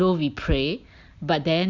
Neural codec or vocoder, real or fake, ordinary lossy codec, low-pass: none; real; Opus, 64 kbps; 7.2 kHz